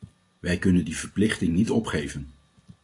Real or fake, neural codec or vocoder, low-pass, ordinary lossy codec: real; none; 10.8 kHz; AAC, 48 kbps